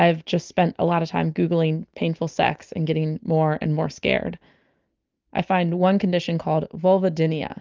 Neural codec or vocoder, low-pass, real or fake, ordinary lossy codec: none; 7.2 kHz; real; Opus, 24 kbps